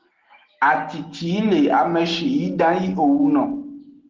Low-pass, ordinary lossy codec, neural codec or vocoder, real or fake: 7.2 kHz; Opus, 16 kbps; none; real